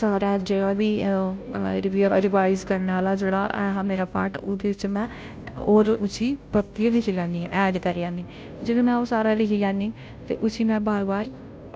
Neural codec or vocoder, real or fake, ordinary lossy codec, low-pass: codec, 16 kHz, 0.5 kbps, FunCodec, trained on Chinese and English, 25 frames a second; fake; none; none